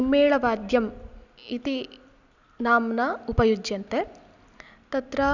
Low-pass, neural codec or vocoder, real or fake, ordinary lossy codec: 7.2 kHz; none; real; none